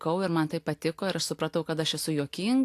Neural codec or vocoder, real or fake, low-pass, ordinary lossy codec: none; real; 14.4 kHz; AAC, 64 kbps